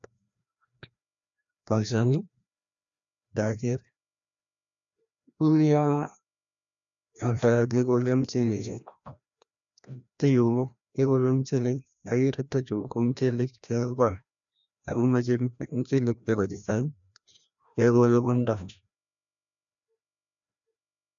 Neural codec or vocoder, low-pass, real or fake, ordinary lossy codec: codec, 16 kHz, 1 kbps, FreqCodec, larger model; 7.2 kHz; fake; MP3, 96 kbps